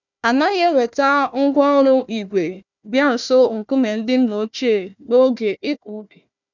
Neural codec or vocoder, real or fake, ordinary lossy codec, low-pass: codec, 16 kHz, 1 kbps, FunCodec, trained on Chinese and English, 50 frames a second; fake; none; 7.2 kHz